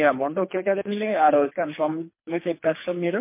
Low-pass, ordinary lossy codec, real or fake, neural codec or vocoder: 3.6 kHz; MP3, 24 kbps; fake; codec, 24 kHz, 3 kbps, HILCodec